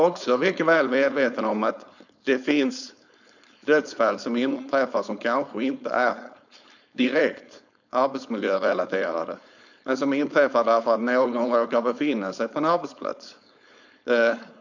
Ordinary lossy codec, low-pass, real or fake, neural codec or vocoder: none; 7.2 kHz; fake; codec, 16 kHz, 4.8 kbps, FACodec